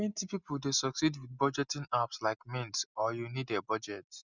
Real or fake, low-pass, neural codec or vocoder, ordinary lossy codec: real; 7.2 kHz; none; none